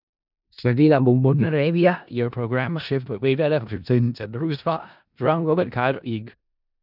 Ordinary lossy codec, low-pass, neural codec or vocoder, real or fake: none; 5.4 kHz; codec, 16 kHz in and 24 kHz out, 0.4 kbps, LongCat-Audio-Codec, four codebook decoder; fake